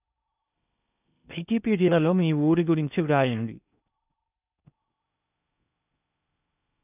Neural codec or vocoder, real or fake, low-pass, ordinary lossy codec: codec, 16 kHz in and 24 kHz out, 0.6 kbps, FocalCodec, streaming, 4096 codes; fake; 3.6 kHz; none